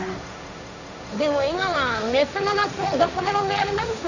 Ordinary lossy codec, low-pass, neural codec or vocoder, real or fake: none; 7.2 kHz; codec, 16 kHz, 1.1 kbps, Voila-Tokenizer; fake